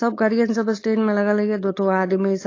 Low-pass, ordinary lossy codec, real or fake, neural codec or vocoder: 7.2 kHz; AAC, 32 kbps; fake; codec, 16 kHz, 4.8 kbps, FACodec